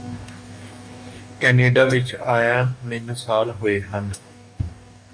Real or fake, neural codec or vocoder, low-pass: fake; codec, 44.1 kHz, 2.6 kbps, DAC; 9.9 kHz